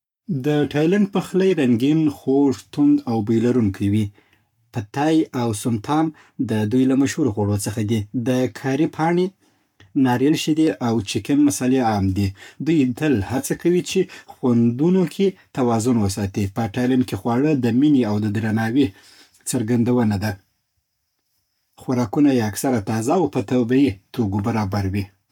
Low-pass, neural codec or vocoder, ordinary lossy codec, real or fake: 19.8 kHz; codec, 44.1 kHz, 7.8 kbps, Pupu-Codec; none; fake